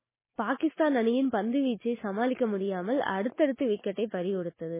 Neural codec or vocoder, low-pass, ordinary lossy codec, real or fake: none; 3.6 kHz; MP3, 16 kbps; real